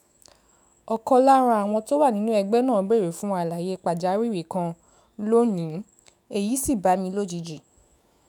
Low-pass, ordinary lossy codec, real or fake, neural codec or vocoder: none; none; fake; autoencoder, 48 kHz, 128 numbers a frame, DAC-VAE, trained on Japanese speech